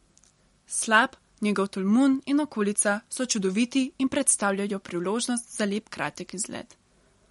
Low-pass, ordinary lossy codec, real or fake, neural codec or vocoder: 19.8 kHz; MP3, 48 kbps; real; none